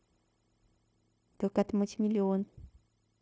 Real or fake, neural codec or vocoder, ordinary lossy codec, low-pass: fake; codec, 16 kHz, 0.9 kbps, LongCat-Audio-Codec; none; none